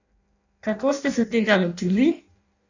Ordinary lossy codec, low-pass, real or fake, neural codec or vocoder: none; 7.2 kHz; fake; codec, 16 kHz in and 24 kHz out, 0.6 kbps, FireRedTTS-2 codec